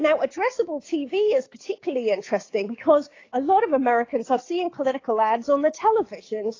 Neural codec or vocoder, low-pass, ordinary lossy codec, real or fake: codec, 16 kHz, 6 kbps, DAC; 7.2 kHz; AAC, 32 kbps; fake